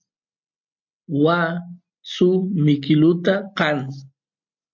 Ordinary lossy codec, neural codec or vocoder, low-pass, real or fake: MP3, 48 kbps; none; 7.2 kHz; real